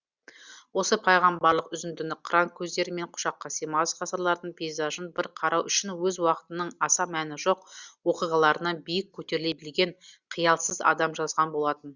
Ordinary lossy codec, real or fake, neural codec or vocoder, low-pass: Opus, 64 kbps; real; none; 7.2 kHz